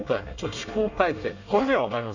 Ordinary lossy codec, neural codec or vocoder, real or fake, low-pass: none; codec, 24 kHz, 1 kbps, SNAC; fake; 7.2 kHz